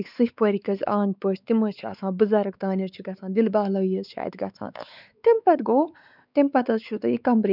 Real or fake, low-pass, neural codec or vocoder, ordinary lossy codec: fake; 5.4 kHz; codec, 16 kHz, 4 kbps, X-Codec, WavLM features, trained on Multilingual LibriSpeech; none